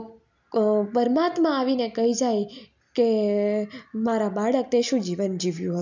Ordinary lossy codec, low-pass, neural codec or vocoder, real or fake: none; 7.2 kHz; none; real